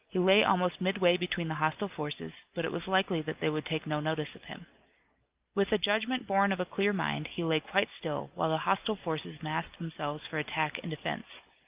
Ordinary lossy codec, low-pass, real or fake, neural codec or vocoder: Opus, 24 kbps; 3.6 kHz; real; none